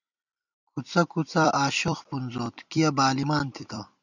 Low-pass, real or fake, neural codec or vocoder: 7.2 kHz; fake; vocoder, 44.1 kHz, 128 mel bands every 256 samples, BigVGAN v2